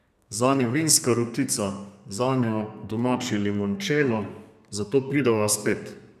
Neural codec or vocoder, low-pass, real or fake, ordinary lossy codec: codec, 32 kHz, 1.9 kbps, SNAC; 14.4 kHz; fake; none